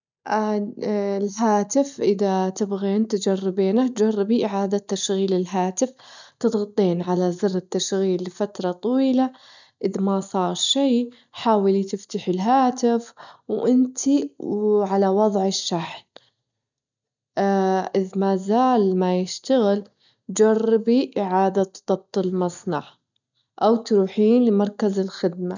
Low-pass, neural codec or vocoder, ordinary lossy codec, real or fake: 7.2 kHz; none; none; real